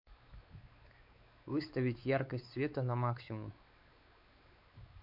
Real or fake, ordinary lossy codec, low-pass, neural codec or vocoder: fake; MP3, 48 kbps; 5.4 kHz; codec, 16 kHz, 4 kbps, X-Codec, WavLM features, trained on Multilingual LibriSpeech